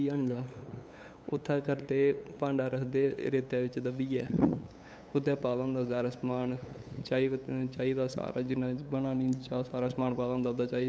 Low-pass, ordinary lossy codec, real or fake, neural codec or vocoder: none; none; fake; codec, 16 kHz, 8 kbps, FunCodec, trained on LibriTTS, 25 frames a second